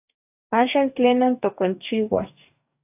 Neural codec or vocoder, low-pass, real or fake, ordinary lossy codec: codec, 44.1 kHz, 2.6 kbps, DAC; 3.6 kHz; fake; AAC, 32 kbps